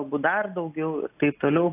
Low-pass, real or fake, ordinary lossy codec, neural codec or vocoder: 3.6 kHz; real; AAC, 24 kbps; none